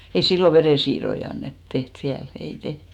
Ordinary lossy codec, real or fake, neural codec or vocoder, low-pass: none; fake; autoencoder, 48 kHz, 128 numbers a frame, DAC-VAE, trained on Japanese speech; 19.8 kHz